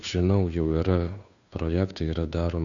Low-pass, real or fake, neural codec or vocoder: 7.2 kHz; fake; codec, 16 kHz, 2 kbps, FunCodec, trained on Chinese and English, 25 frames a second